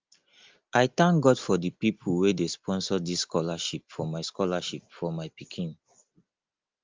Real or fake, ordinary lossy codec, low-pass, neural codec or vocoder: real; Opus, 32 kbps; 7.2 kHz; none